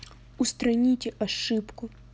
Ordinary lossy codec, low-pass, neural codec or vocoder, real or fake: none; none; none; real